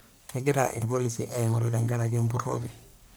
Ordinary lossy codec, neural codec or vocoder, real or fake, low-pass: none; codec, 44.1 kHz, 1.7 kbps, Pupu-Codec; fake; none